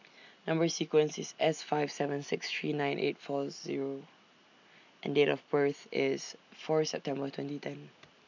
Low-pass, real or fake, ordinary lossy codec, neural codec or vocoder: 7.2 kHz; real; none; none